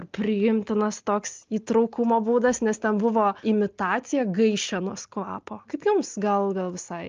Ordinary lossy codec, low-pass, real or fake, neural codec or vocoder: Opus, 24 kbps; 7.2 kHz; real; none